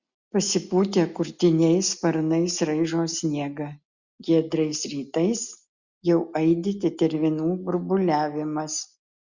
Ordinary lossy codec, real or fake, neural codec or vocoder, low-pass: Opus, 64 kbps; real; none; 7.2 kHz